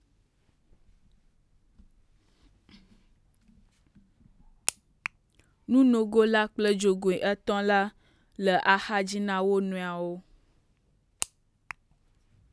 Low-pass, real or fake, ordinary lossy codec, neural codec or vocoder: none; real; none; none